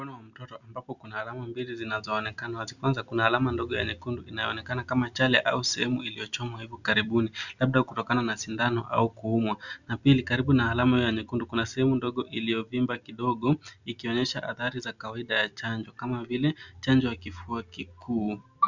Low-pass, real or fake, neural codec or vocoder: 7.2 kHz; real; none